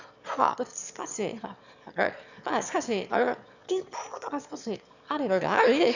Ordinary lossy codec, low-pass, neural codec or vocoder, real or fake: none; 7.2 kHz; autoencoder, 22.05 kHz, a latent of 192 numbers a frame, VITS, trained on one speaker; fake